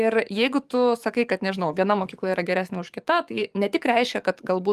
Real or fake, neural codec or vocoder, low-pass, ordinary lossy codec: fake; codec, 44.1 kHz, 7.8 kbps, DAC; 14.4 kHz; Opus, 32 kbps